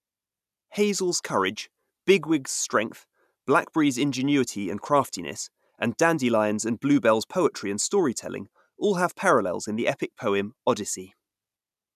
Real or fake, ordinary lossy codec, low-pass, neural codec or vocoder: real; none; 14.4 kHz; none